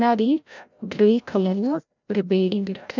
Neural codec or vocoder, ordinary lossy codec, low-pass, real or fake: codec, 16 kHz, 0.5 kbps, FreqCodec, larger model; none; 7.2 kHz; fake